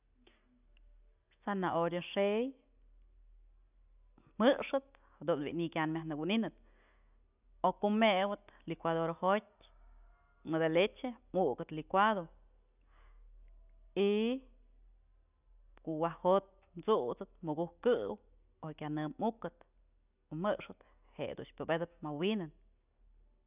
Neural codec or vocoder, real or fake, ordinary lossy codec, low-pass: none; real; none; 3.6 kHz